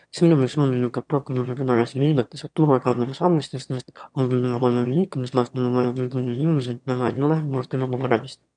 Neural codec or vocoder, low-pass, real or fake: autoencoder, 22.05 kHz, a latent of 192 numbers a frame, VITS, trained on one speaker; 9.9 kHz; fake